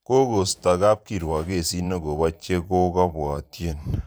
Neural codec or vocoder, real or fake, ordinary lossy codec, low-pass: vocoder, 44.1 kHz, 128 mel bands every 512 samples, BigVGAN v2; fake; none; none